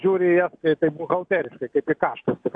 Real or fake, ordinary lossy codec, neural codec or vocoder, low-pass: real; AAC, 64 kbps; none; 9.9 kHz